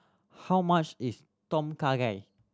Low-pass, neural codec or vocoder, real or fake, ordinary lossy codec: none; none; real; none